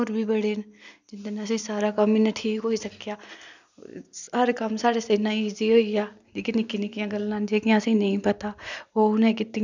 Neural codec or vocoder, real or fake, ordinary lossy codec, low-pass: none; real; none; 7.2 kHz